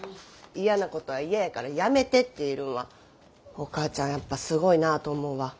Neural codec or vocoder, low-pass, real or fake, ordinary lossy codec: none; none; real; none